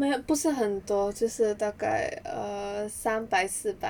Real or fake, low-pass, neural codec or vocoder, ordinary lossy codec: real; 19.8 kHz; none; none